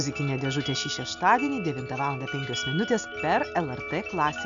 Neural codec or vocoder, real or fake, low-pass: none; real; 7.2 kHz